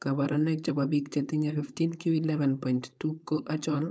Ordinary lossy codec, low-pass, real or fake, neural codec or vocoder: none; none; fake; codec, 16 kHz, 4.8 kbps, FACodec